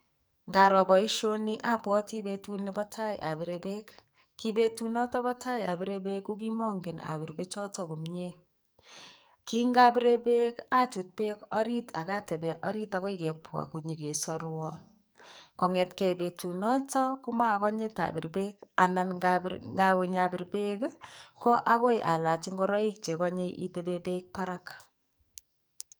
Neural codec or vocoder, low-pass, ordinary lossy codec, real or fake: codec, 44.1 kHz, 2.6 kbps, SNAC; none; none; fake